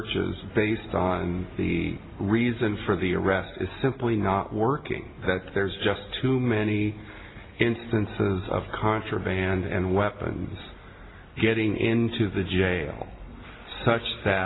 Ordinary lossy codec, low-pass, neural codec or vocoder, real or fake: AAC, 16 kbps; 7.2 kHz; none; real